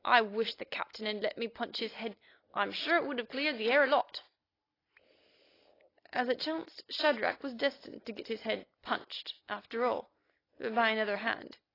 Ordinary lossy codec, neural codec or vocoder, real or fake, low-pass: AAC, 24 kbps; none; real; 5.4 kHz